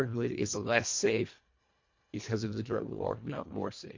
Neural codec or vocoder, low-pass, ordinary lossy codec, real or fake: codec, 24 kHz, 1.5 kbps, HILCodec; 7.2 kHz; MP3, 48 kbps; fake